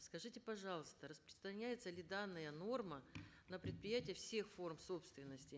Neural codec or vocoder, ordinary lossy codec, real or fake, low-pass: none; none; real; none